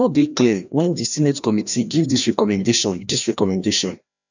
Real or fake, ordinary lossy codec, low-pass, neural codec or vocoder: fake; none; 7.2 kHz; codec, 16 kHz, 1 kbps, FreqCodec, larger model